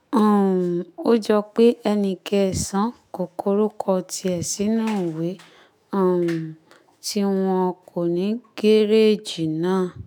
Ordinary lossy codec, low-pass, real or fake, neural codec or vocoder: none; none; fake; autoencoder, 48 kHz, 128 numbers a frame, DAC-VAE, trained on Japanese speech